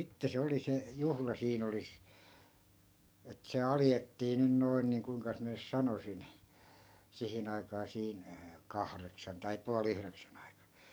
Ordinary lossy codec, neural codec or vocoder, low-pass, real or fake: none; codec, 44.1 kHz, 7.8 kbps, DAC; none; fake